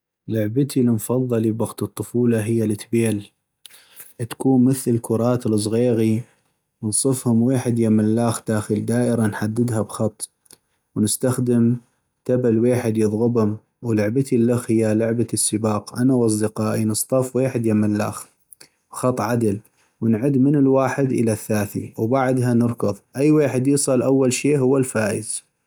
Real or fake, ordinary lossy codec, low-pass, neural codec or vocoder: real; none; none; none